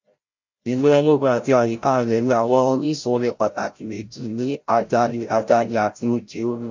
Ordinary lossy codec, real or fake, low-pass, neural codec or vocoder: MP3, 48 kbps; fake; 7.2 kHz; codec, 16 kHz, 0.5 kbps, FreqCodec, larger model